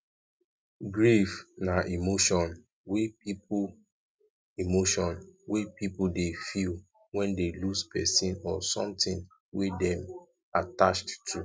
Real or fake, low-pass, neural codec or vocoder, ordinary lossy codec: real; none; none; none